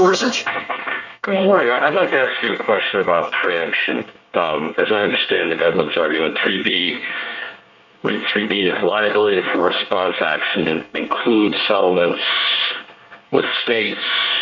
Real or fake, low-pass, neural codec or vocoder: fake; 7.2 kHz; codec, 24 kHz, 1 kbps, SNAC